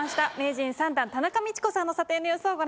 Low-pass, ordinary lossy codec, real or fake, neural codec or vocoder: none; none; real; none